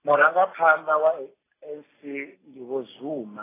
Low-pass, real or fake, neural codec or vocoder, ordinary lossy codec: 3.6 kHz; real; none; AAC, 16 kbps